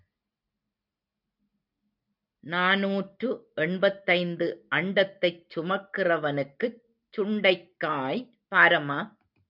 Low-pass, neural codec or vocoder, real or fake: 5.4 kHz; none; real